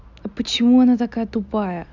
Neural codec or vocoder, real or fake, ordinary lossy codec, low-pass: none; real; none; 7.2 kHz